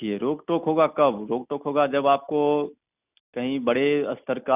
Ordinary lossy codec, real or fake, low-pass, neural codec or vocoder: none; real; 3.6 kHz; none